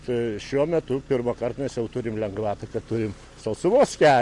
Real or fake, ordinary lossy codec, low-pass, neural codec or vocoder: real; MP3, 48 kbps; 10.8 kHz; none